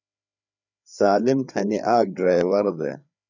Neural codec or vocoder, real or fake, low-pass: codec, 16 kHz, 4 kbps, FreqCodec, larger model; fake; 7.2 kHz